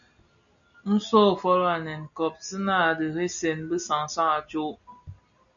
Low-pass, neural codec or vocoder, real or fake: 7.2 kHz; none; real